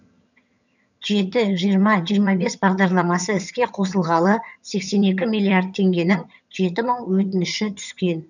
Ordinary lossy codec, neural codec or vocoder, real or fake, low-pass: none; vocoder, 22.05 kHz, 80 mel bands, HiFi-GAN; fake; 7.2 kHz